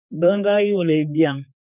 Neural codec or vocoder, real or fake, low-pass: codec, 16 kHz, 4 kbps, X-Codec, HuBERT features, trained on general audio; fake; 3.6 kHz